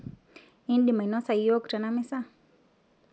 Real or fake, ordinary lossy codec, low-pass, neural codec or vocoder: real; none; none; none